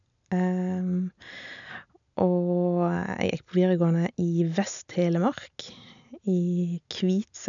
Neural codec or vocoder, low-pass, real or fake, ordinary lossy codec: none; 7.2 kHz; real; none